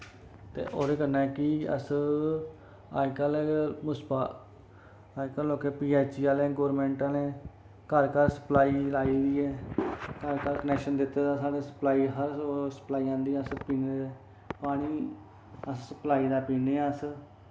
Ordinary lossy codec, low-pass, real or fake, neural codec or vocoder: none; none; real; none